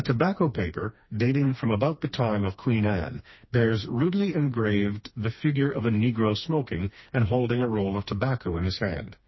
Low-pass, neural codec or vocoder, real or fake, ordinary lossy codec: 7.2 kHz; codec, 16 kHz, 2 kbps, FreqCodec, smaller model; fake; MP3, 24 kbps